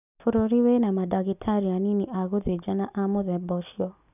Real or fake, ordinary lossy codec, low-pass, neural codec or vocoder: real; none; 3.6 kHz; none